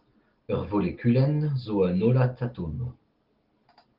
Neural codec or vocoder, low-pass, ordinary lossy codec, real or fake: none; 5.4 kHz; Opus, 16 kbps; real